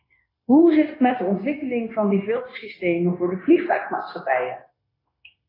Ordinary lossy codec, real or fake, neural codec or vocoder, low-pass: AAC, 24 kbps; fake; codec, 16 kHz, 0.9 kbps, LongCat-Audio-Codec; 5.4 kHz